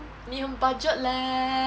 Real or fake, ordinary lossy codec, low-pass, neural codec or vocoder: real; none; none; none